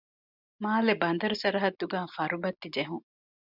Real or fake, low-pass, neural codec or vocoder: real; 5.4 kHz; none